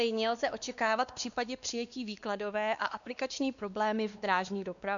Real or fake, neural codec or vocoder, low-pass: fake; codec, 16 kHz, 2 kbps, X-Codec, WavLM features, trained on Multilingual LibriSpeech; 7.2 kHz